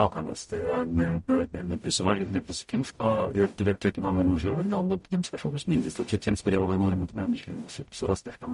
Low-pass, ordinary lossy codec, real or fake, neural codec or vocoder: 19.8 kHz; MP3, 48 kbps; fake; codec, 44.1 kHz, 0.9 kbps, DAC